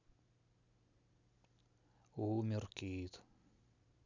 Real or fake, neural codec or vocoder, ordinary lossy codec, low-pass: real; none; none; 7.2 kHz